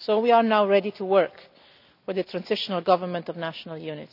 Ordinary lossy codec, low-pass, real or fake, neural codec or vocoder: none; 5.4 kHz; real; none